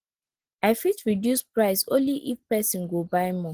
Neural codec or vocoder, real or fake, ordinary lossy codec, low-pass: none; real; Opus, 16 kbps; 14.4 kHz